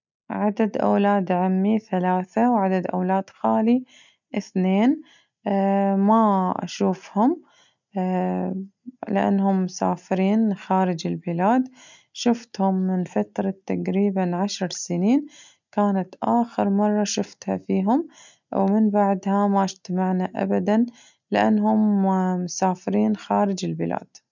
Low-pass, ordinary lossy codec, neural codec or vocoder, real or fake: 7.2 kHz; none; none; real